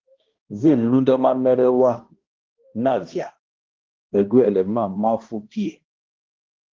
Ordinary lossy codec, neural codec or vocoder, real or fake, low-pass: Opus, 16 kbps; codec, 16 kHz, 1 kbps, X-Codec, HuBERT features, trained on balanced general audio; fake; 7.2 kHz